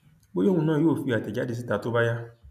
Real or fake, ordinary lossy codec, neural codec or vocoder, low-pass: real; none; none; 14.4 kHz